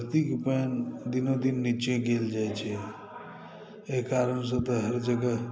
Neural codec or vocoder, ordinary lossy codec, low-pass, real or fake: none; none; none; real